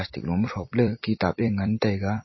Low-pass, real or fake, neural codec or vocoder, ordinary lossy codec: 7.2 kHz; fake; vocoder, 44.1 kHz, 128 mel bands every 256 samples, BigVGAN v2; MP3, 24 kbps